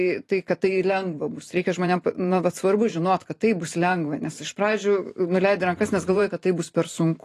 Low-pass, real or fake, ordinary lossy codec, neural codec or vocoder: 14.4 kHz; fake; AAC, 48 kbps; vocoder, 44.1 kHz, 128 mel bands every 512 samples, BigVGAN v2